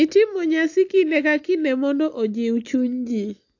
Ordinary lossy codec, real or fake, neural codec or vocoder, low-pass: AAC, 48 kbps; real; none; 7.2 kHz